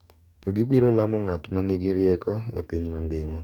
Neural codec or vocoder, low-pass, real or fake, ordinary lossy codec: codec, 44.1 kHz, 2.6 kbps, DAC; 19.8 kHz; fake; none